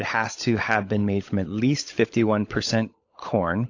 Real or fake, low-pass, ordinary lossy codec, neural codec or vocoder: fake; 7.2 kHz; AAC, 48 kbps; codec, 16 kHz, 16 kbps, FunCodec, trained on LibriTTS, 50 frames a second